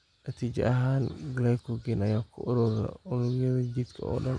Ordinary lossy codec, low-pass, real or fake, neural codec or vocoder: none; 9.9 kHz; real; none